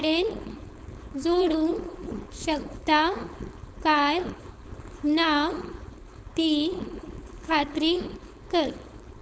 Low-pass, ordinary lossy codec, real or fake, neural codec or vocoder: none; none; fake; codec, 16 kHz, 4.8 kbps, FACodec